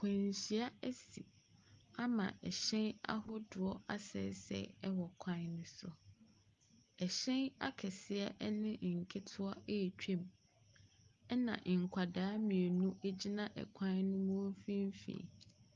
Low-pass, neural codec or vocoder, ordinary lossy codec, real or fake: 7.2 kHz; none; Opus, 32 kbps; real